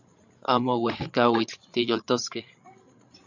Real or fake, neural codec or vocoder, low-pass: fake; codec, 16 kHz, 8 kbps, FreqCodec, larger model; 7.2 kHz